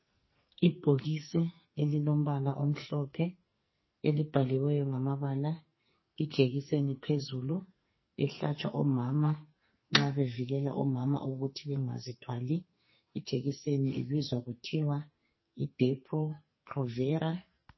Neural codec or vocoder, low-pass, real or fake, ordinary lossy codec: codec, 44.1 kHz, 2.6 kbps, SNAC; 7.2 kHz; fake; MP3, 24 kbps